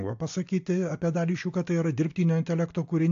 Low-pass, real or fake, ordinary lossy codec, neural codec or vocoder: 7.2 kHz; real; AAC, 48 kbps; none